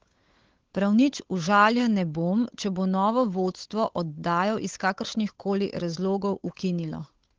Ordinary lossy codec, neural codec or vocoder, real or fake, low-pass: Opus, 16 kbps; codec, 16 kHz, 8 kbps, FunCodec, trained on Chinese and English, 25 frames a second; fake; 7.2 kHz